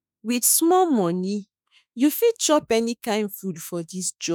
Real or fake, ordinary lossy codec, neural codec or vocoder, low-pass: fake; none; autoencoder, 48 kHz, 32 numbers a frame, DAC-VAE, trained on Japanese speech; none